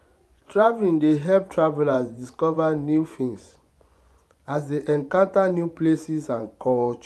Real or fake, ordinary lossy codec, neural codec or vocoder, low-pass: fake; none; vocoder, 24 kHz, 100 mel bands, Vocos; none